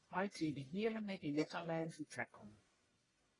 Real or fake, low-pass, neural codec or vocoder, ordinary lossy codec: fake; 10.8 kHz; codec, 44.1 kHz, 1.7 kbps, Pupu-Codec; AAC, 32 kbps